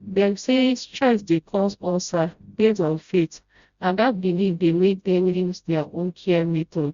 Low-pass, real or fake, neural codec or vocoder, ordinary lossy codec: 7.2 kHz; fake; codec, 16 kHz, 0.5 kbps, FreqCodec, smaller model; Opus, 64 kbps